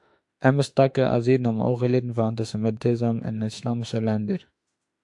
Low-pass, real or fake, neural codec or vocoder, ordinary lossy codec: 10.8 kHz; fake; autoencoder, 48 kHz, 32 numbers a frame, DAC-VAE, trained on Japanese speech; AAC, 64 kbps